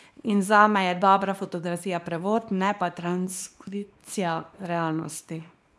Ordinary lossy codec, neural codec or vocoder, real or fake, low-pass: none; codec, 24 kHz, 0.9 kbps, WavTokenizer, small release; fake; none